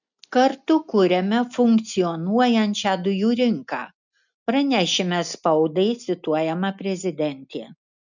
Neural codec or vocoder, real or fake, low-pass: none; real; 7.2 kHz